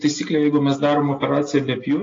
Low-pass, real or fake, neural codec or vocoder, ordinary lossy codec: 7.2 kHz; real; none; AAC, 32 kbps